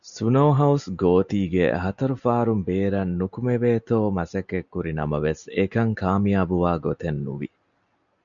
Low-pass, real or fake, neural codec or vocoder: 7.2 kHz; real; none